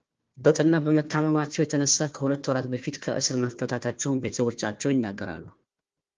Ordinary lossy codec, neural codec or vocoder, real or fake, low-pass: Opus, 32 kbps; codec, 16 kHz, 1 kbps, FunCodec, trained on Chinese and English, 50 frames a second; fake; 7.2 kHz